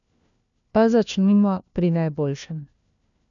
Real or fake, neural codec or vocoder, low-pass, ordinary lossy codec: fake; codec, 16 kHz, 1 kbps, FunCodec, trained on LibriTTS, 50 frames a second; 7.2 kHz; none